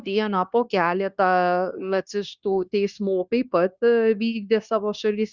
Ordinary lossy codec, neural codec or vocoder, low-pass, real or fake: Opus, 64 kbps; codec, 16 kHz, 0.9 kbps, LongCat-Audio-Codec; 7.2 kHz; fake